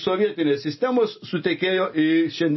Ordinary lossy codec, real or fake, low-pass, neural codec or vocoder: MP3, 24 kbps; real; 7.2 kHz; none